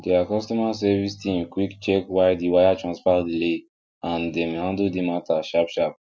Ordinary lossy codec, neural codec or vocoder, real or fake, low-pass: none; none; real; none